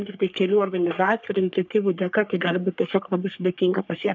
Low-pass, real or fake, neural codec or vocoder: 7.2 kHz; fake; codec, 44.1 kHz, 3.4 kbps, Pupu-Codec